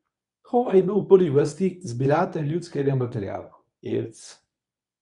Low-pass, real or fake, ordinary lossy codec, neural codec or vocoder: 10.8 kHz; fake; none; codec, 24 kHz, 0.9 kbps, WavTokenizer, medium speech release version 1